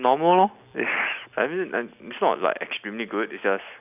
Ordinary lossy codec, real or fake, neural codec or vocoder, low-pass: none; real; none; 3.6 kHz